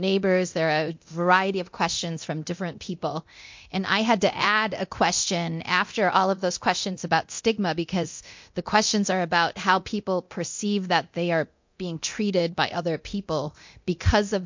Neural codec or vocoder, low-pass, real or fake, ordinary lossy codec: codec, 24 kHz, 0.9 kbps, DualCodec; 7.2 kHz; fake; MP3, 48 kbps